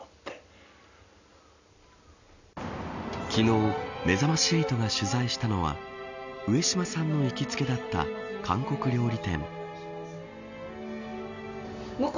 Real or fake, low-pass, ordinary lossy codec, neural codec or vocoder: real; 7.2 kHz; none; none